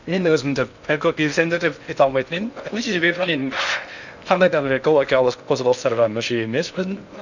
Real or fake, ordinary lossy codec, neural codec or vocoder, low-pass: fake; none; codec, 16 kHz in and 24 kHz out, 0.6 kbps, FocalCodec, streaming, 2048 codes; 7.2 kHz